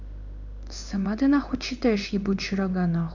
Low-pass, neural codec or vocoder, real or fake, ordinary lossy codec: 7.2 kHz; codec, 16 kHz in and 24 kHz out, 1 kbps, XY-Tokenizer; fake; none